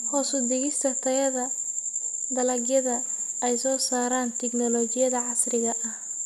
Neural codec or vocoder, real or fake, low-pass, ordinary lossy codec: none; real; 14.4 kHz; none